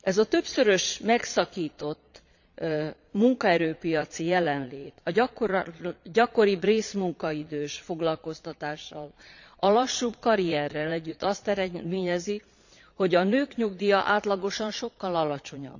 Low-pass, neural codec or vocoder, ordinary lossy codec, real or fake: 7.2 kHz; vocoder, 22.05 kHz, 80 mel bands, Vocos; none; fake